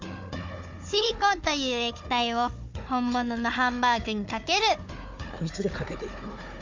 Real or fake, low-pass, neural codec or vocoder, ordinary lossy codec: fake; 7.2 kHz; codec, 16 kHz, 4 kbps, FunCodec, trained on Chinese and English, 50 frames a second; MP3, 64 kbps